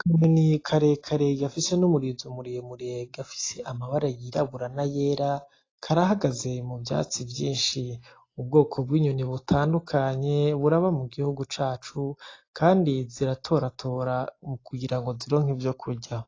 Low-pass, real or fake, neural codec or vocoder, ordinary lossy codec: 7.2 kHz; real; none; AAC, 32 kbps